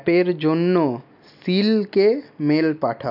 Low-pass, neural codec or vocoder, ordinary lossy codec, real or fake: 5.4 kHz; none; none; real